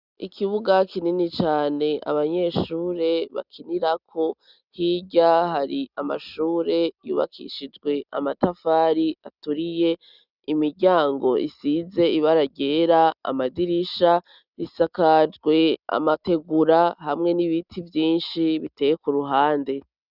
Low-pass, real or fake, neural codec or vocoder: 5.4 kHz; real; none